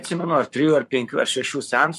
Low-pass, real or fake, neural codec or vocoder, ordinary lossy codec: 14.4 kHz; fake; codec, 44.1 kHz, 7.8 kbps, Pupu-Codec; MP3, 64 kbps